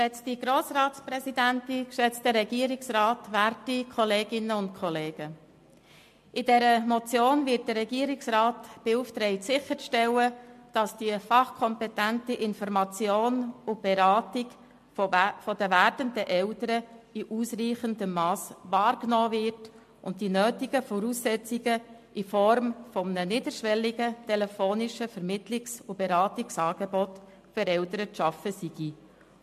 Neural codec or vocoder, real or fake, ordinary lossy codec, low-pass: none; real; MP3, 64 kbps; 14.4 kHz